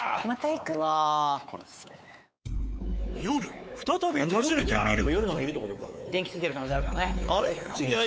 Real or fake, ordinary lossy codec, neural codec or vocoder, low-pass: fake; none; codec, 16 kHz, 4 kbps, X-Codec, WavLM features, trained on Multilingual LibriSpeech; none